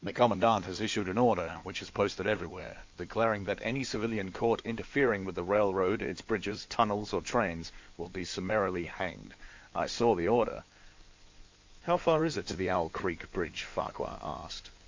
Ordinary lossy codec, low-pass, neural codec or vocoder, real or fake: MP3, 64 kbps; 7.2 kHz; codec, 16 kHz in and 24 kHz out, 2.2 kbps, FireRedTTS-2 codec; fake